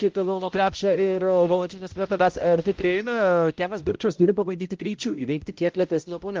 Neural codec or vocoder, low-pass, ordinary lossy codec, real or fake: codec, 16 kHz, 0.5 kbps, X-Codec, HuBERT features, trained on balanced general audio; 7.2 kHz; Opus, 24 kbps; fake